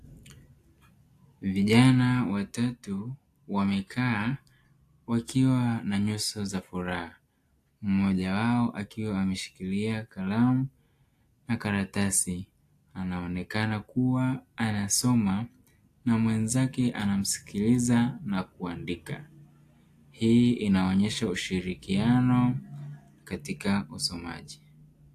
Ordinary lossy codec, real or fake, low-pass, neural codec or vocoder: AAC, 64 kbps; real; 14.4 kHz; none